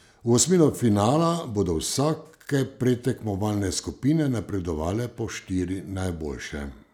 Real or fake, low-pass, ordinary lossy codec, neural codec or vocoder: real; 19.8 kHz; none; none